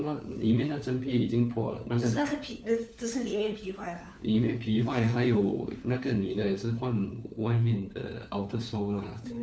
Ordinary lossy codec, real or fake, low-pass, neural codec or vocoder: none; fake; none; codec, 16 kHz, 4 kbps, FunCodec, trained on LibriTTS, 50 frames a second